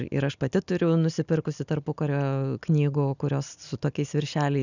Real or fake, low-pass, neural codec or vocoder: real; 7.2 kHz; none